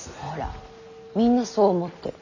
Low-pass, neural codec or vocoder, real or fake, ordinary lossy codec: 7.2 kHz; none; real; none